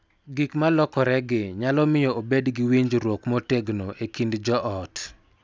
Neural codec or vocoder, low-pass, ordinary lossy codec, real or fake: none; none; none; real